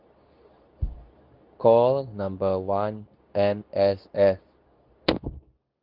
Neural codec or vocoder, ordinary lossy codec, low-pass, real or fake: codec, 24 kHz, 0.9 kbps, WavTokenizer, medium speech release version 2; Opus, 24 kbps; 5.4 kHz; fake